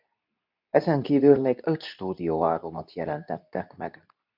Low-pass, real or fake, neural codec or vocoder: 5.4 kHz; fake; codec, 24 kHz, 0.9 kbps, WavTokenizer, medium speech release version 2